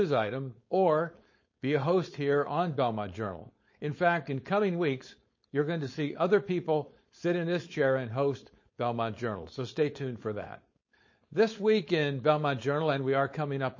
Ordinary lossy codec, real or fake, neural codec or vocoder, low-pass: MP3, 32 kbps; fake; codec, 16 kHz, 4.8 kbps, FACodec; 7.2 kHz